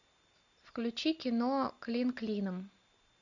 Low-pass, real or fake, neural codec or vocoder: 7.2 kHz; real; none